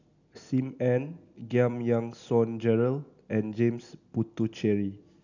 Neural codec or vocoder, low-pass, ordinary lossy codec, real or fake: none; 7.2 kHz; none; real